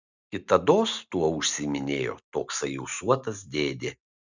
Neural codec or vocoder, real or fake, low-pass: none; real; 7.2 kHz